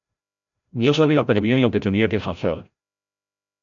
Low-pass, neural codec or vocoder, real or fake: 7.2 kHz; codec, 16 kHz, 0.5 kbps, FreqCodec, larger model; fake